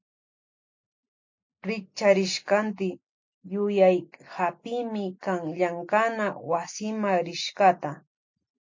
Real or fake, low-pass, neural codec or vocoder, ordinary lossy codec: real; 7.2 kHz; none; MP3, 48 kbps